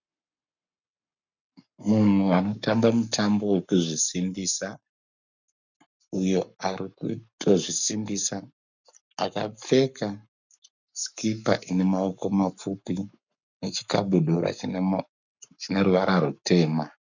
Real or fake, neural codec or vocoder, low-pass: fake; codec, 44.1 kHz, 7.8 kbps, Pupu-Codec; 7.2 kHz